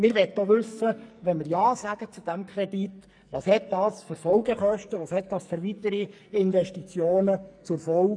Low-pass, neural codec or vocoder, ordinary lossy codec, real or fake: 9.9 kHz; codec, 44.1 kHz, 2.6 kbps, SNAC; MP3, 96 kbps; fake